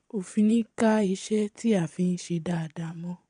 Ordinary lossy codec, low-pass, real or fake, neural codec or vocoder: MP3, 64 kbps; 9.9 kHz; fake; vocoder, 22.05 kHz, 80 mel bands, Vocos